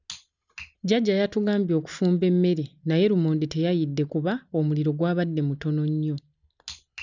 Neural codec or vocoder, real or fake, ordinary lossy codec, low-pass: none; real; none; 7.2 kHz